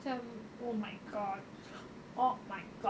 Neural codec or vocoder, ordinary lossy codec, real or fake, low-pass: none; none; real; none